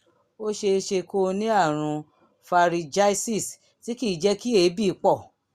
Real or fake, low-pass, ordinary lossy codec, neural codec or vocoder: real; 14.4 kHz; Opus, 64 kbps; none